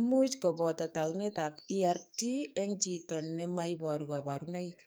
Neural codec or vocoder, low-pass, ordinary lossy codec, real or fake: codec, 44.1 kHz, 2.6 kbps, SNAC; none; none; fake